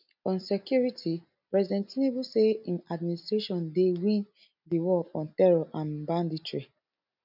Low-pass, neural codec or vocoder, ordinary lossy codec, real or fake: 5.4 kHz; none; none; real